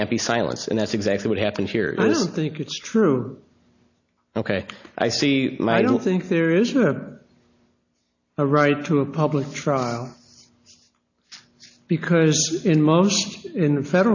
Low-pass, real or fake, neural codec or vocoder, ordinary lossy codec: 7.2 kHz; real; none; AAC, 48 kbps